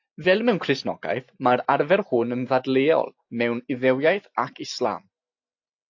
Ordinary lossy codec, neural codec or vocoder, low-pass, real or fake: AAC, 48 kbps; none; 7.2 kHz; real